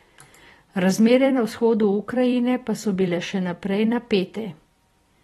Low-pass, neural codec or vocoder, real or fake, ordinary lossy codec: 19.8 kHz; vocoder, 44.1 kHz, 128 mel bands every 256 samples, BigVGAN v2; fake; AAC, 32 kbps